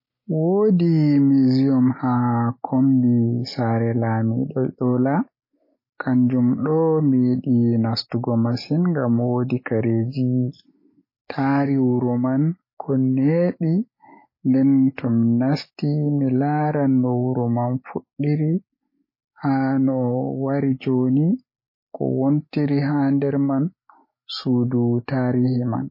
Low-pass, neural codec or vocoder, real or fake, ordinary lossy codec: 5.4 kHz; none; real; MP3, 24 kbps